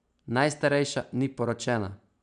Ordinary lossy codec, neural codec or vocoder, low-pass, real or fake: AAC, 96 kbps; none; 9.9 kHz; real